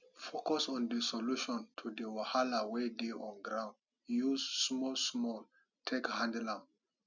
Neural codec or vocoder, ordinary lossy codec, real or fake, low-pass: none; none; real; 7.2 kHz